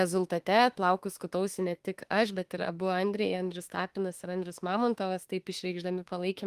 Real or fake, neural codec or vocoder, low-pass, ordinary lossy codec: fake; autoencoder, 48 kHz, 32 numbers a frame, DAC-VAE, trained on Japanese speech; 14.4 kHz; Opus, 24 kbps